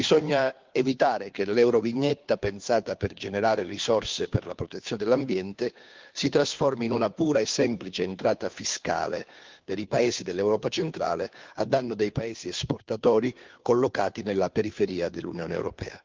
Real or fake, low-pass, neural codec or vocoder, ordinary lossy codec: fake; 7.2 kHz; codec, 16 kHz, 2 kbps, FunCodec, trained on Chinese and English, 25 frames a second; Opus, 24 kbps